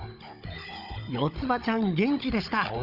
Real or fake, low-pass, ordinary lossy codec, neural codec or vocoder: fake; 5.4 kHz; none; codec, 16 kHz, 16 kbps, FunCodec, trained on Chinese and English, 50 frames a second